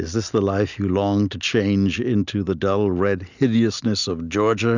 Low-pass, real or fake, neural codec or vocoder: 7.2 kHz; real; none